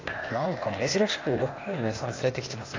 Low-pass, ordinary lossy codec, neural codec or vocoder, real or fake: 7.2 kHz; AAC, 32 kbps; codec, 16 kHz, 0.8 kbps, ZipCodec; fake